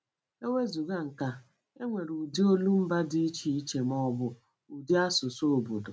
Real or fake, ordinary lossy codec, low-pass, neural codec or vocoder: real; none; none; none